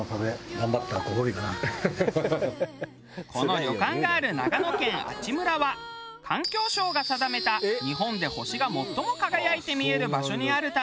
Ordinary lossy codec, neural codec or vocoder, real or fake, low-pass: none; none; real; none